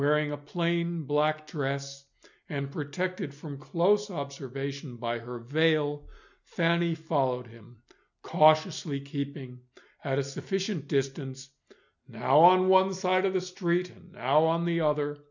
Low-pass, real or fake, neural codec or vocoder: 7.2 kHz; real; none